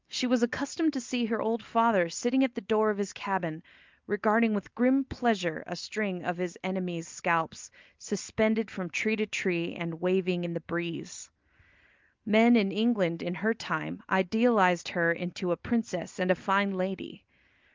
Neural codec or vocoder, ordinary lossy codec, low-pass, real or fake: none; Opus, 24 kbps; 7.2 kHz; real